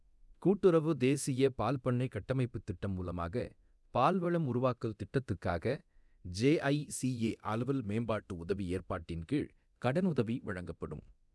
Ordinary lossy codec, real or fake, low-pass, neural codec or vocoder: none; fake; none; codec, 24 kHz, 0.9 kbps, DualCodec